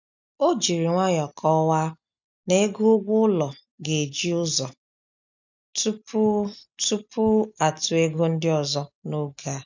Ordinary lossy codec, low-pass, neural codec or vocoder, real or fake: none; 7.2 kHz; none; real